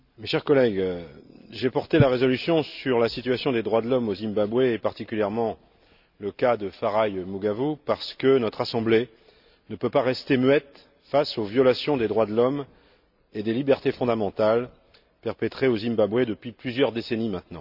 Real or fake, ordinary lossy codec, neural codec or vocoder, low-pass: real; none; none; 5.4 kHz